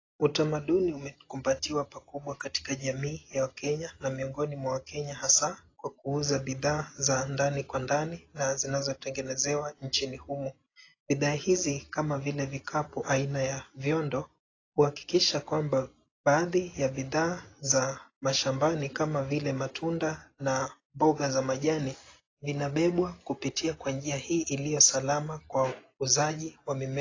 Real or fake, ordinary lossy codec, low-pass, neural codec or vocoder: real; AAC, 32 kbps; 7.2 kHz; none